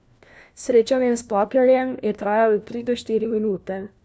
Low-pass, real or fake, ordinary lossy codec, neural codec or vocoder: none; fake; none; codec, 16 kHz, 1 kbps, FunCodec, trained on LibriTTS, 50 frames a second